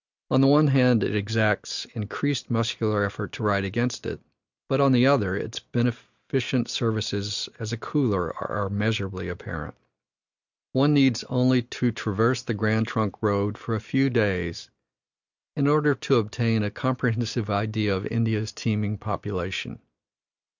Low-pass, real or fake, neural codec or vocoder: 7.2 kHz; real; none